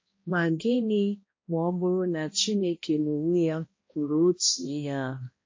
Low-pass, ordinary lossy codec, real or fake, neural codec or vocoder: 7.2 kHz; MP3, 32 kbps; fake; codec, 16 kHz, 1 kbps, X-Codec, HuBERT features, trained on balanced general audio